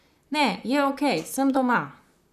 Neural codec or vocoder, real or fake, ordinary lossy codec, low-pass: vocoder, 44.1 kHz, 128 mel bands, Pupu-Vocoder; fake; none; 14.4 kHz